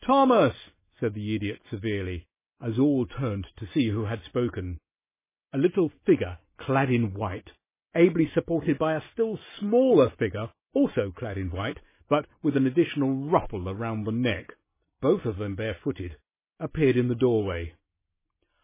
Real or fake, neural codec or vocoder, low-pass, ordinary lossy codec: real; none; 3.6 kHz; MP3, 16 kbps